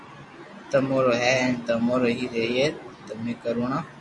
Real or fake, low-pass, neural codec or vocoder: real; 10.8 kHz; none